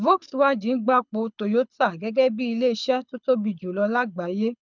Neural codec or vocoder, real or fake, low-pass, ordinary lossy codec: codec, 24 kHz, 6 kbps, HILCodec; fake; 7.2 kHz; none